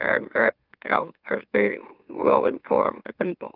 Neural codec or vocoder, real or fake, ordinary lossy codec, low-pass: autoencoder, 44.1 kHz, a latent of 192 numbers a frame, MeloTTS; fake; Opus, 24 kbps; 5.4 kHz